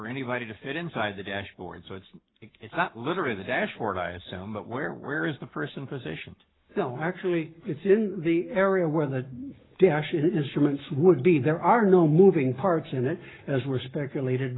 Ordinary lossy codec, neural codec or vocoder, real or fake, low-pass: AAC, 16 kbps; codec, 44.1 kHz, 7.8 kbps, Pupu-Codec; fake; 7.2 kHz